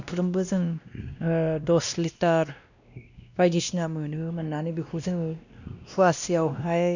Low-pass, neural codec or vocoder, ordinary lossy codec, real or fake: 7.2 kHz; codec, 16 kHz, 1 kbps, X-Codec, WavLM features, trained on Multilingual LibriSpeech; none; fake